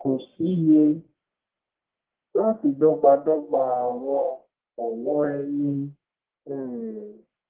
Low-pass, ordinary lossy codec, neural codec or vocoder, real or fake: 3.6 kHz; Opus, 16 kbps; codec, 44.1 kHz, 1.7 kbps, Pupu-Codec; fake